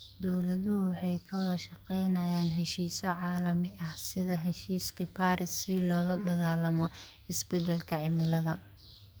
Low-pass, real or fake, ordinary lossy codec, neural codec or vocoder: none; fake; none; codec, 44.1 kHz, 2.6 kbps, SNAC